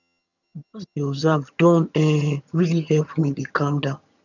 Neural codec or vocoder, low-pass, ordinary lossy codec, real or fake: vocoder, 22.05 kHz, 80 mel bands, HiFi-GAN; 7.2 kHz; none; fake